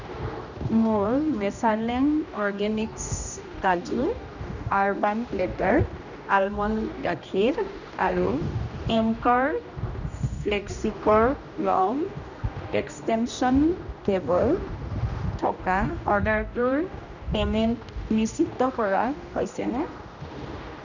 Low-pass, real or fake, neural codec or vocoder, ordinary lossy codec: 7.2 kHz; fake; codec, 16 kHz, 1 kbps, X-Codec, HuBERT features, trained on general audio; none